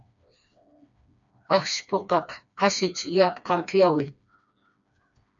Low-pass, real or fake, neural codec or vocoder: 7.2 kHz; fake; codec, 16 kHz, 2 kbps, FreqCodec, smaller model